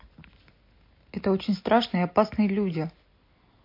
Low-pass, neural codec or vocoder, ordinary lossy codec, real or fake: 5.4 kHz; none; MP3, 32 kbps; real